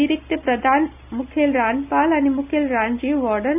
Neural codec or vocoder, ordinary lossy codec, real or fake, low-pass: none; none; real; 3.6 kHz